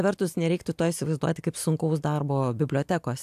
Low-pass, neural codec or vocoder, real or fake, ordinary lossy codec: 14.4 kHz; none; real; AAC, 96 kbps